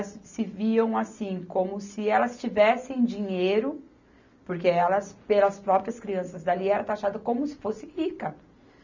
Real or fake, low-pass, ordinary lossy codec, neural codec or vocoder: real; 7.2 kHz; none; none